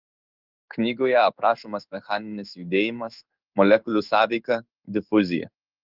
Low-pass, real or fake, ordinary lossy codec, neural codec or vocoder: 5.4 kHz; fake; Opus, 32 kbps; codec, 16 kHz in and 24 kHz out, 1 kbps, XY-Tokenizer